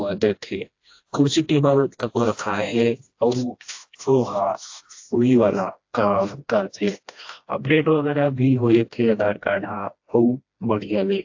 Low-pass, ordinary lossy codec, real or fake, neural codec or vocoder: 7.2 kHz; AAC, 48 kbps; fake; codec, 16 kHz, 1 kbps, FreqCodec, smaller model